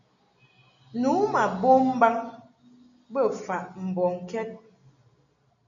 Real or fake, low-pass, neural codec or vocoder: real; 7.2 kHz; none